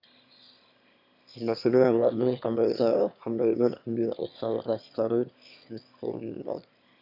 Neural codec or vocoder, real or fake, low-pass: autoencoder, 22.05 kHz, a latent of 192 numbers a frame, VITS, trained on one speaker; fake; 5.4 kHz